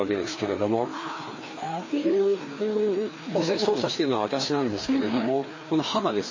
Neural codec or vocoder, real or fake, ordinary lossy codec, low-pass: codec, 16 kHz, 2 kbps, FreqCodec, larger model; fake; MP3, 32 kbps; 7.2 kHz